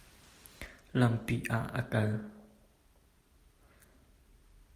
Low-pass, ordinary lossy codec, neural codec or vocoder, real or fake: 14.4 kHz; Opus, 32 kbps; none; real